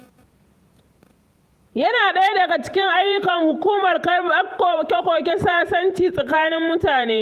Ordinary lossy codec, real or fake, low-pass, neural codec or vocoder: Opus, 32 kbps; fake; 14.4 kHz; vocoder, 48 kHz, 128 mel bands, Vocos